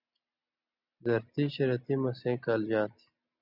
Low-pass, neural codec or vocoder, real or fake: 5.4 kHz; none; real